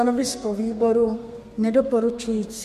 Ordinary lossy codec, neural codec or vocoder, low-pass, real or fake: MP3, 64 kbps; codec, 32 kHz, 1.9 kbps, SNAC; 14.4 kHz; fake